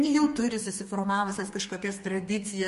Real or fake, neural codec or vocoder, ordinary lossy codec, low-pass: fake; codec, 32 kHz, 1.9 kbps, SNAC; MP3, 48 kbps; 14.4 kHz